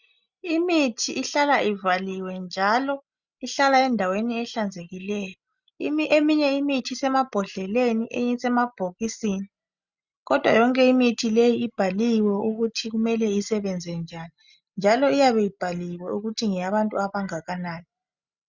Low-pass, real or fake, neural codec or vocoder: 7.2 kHz; real; none